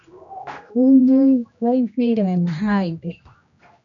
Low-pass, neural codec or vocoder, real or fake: 7.2 kHz; codec, 16 kHz, 1 kbps, X-Codec, HuBERT features, trained on general audio; fake